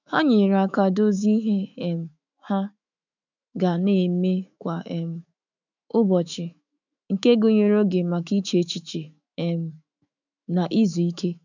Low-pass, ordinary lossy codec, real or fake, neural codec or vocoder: 7.2 kHz; none; fake; autoencoder, 48 kHz, 128 numbers a frame, DAC-VAE, trained on Japanese speech